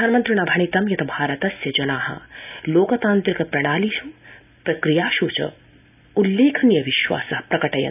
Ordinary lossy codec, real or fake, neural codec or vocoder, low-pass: none; real; none; 3.6 kHz